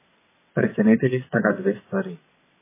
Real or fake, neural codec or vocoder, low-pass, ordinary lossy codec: fake; vocoder, 22.05 kHz, 80 mel bands, WaveNeXt; 3.6 kHz; MP3, 16 kbps